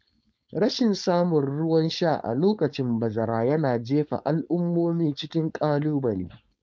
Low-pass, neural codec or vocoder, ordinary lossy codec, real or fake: none; codec, 16 kHz, 4.8 kbps, FACodec; none; fake